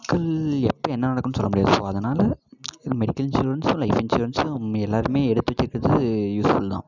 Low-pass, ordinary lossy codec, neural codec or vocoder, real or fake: 7.2 kHz; none; none; real